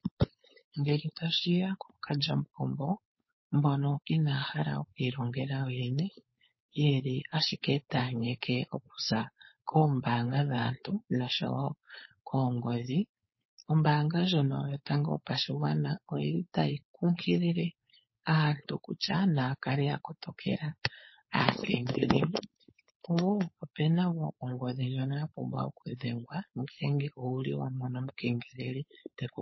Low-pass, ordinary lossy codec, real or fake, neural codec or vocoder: 7.2 kHz; MP3, 24 kbps; fake; codec, 16 kHz, 4.8 kbps, FACodec